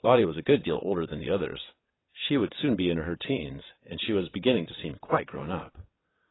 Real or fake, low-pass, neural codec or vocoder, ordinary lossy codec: fake; 7.2 kHz; vocoder, 22.05 kHz, 80 mel bands, WaveNeXt; AAC, 16 kbps